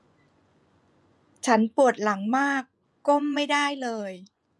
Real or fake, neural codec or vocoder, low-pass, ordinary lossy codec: fake; vocoder, 24 kHz, 100 mel bands, Vocos; none; none